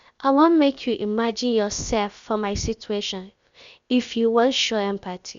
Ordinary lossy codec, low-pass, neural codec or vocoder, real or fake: Opus, 64 kbps; 7.2 kHz; codec, 16 kHz, about 1 kbps, DyCAST, with the encoder's durations; fake